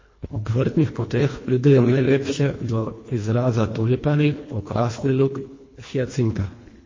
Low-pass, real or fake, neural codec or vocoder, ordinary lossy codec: 7.2 kHz; fake; codec, 24 kHz, 1.5 kbps, HILCodec; MP3, 32 kbps